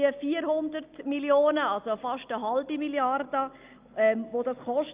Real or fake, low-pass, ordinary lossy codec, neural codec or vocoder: real; 3.6 kHz; Opus, 24 kbps; none